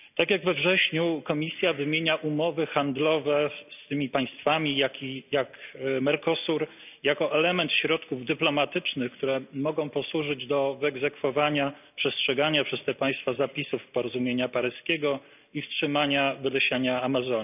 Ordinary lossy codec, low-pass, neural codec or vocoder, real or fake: none; 3.6 kHz; none; real